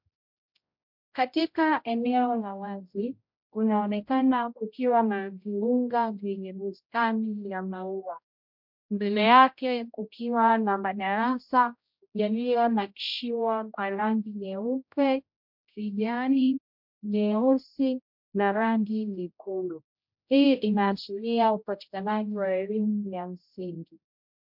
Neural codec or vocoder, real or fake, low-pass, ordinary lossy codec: codec, 16 kHz, 0.5 kbps, X-Codec, HuBERT features, trained on general audio; fake; 5.4 kHz; MP3, 48 kbps